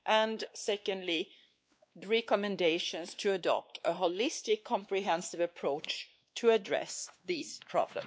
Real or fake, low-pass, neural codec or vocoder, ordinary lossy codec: fake; none; codec, 16 kHz, 2 kbps, X-Codec, WavLM features, trained on Multilingual LibriSpeech; none